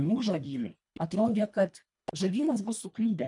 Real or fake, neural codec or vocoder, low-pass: fake; codec, 24 kHz, 1.5 kbps, HILCodec; 10.8 kHz